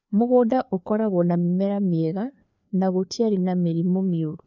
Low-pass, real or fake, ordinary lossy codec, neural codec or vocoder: 7.2 kHz; fake; none; codec, 16 kHz, 2 kbps, FreqCodec, larger model